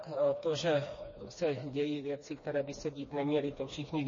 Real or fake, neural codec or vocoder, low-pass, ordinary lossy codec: fake; codec, 16 kHz, 2 kbps, FreqCodec, smaller model; 7.2 kHz; MP3, 32 kbps